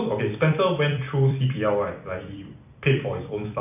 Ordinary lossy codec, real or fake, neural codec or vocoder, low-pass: AAC, 32 kbps; fake; vocoder, 44.1 kHz, 128 mel bands every 256 samples, BigVGAN v2; 3.6 kHz